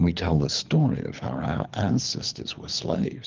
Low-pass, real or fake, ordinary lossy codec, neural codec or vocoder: 7.2 kHz; fake; Opus, 24 kbps; codec, 24 kHz, 3 kbps, HILCodec